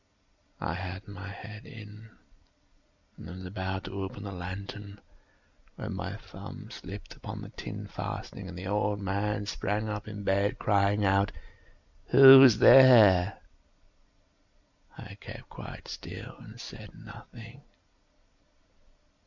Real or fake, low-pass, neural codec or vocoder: real; 7.2 kHz; none